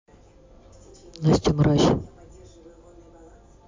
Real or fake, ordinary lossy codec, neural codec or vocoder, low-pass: real; MP3, 64 kbps; none; 7.2 kHz